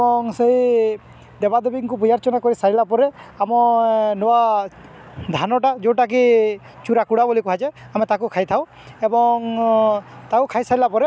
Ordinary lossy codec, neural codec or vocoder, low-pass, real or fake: none; none; none; real